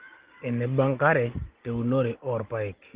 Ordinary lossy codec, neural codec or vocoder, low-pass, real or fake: Opus, 16 kbps; none; 3.6 kHz; real